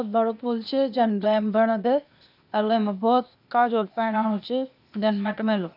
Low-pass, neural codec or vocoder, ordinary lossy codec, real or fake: 5.4 kHz; codec, 16 kHz, 0.8 kbps, ZipCodec; none; fake